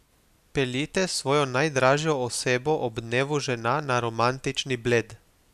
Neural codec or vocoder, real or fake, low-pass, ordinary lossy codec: none; real; 14.4 kHz; none